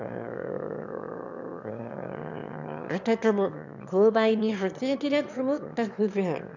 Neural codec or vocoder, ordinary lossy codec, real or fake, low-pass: autoencoder, 22.05 kHz, a latent of 192 numbers a frame, VITS, trained on one speaker; none; fake; 7.2 kHz